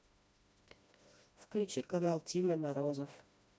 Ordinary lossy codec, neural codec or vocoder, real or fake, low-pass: none; codec, 16 kHz, 1 kbps, FreqCodec, smaller model; fake; none